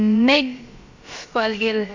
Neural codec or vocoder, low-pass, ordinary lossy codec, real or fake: codec, 16 kHz, about 1 kbps, DyCAST, with the encoder's durations; 7.2 kHz; MP3, 48 kbps; fake